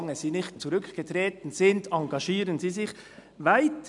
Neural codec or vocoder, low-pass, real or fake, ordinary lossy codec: none; 10.8 kHz; real; none